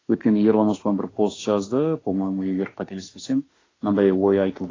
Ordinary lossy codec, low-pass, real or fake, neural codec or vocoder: AAC, 32 kbps; 7.2 kHz; fake; autoencoder, 48 kHz, 32 numbers a frame, DAC-VAE, trained on Japanese speech